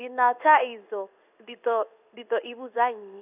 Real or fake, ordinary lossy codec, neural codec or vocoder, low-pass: fake; none; codec, 16 kHz in and 24 kHz out, 1 kbps, XY-Tokenizer; 3.6 kHz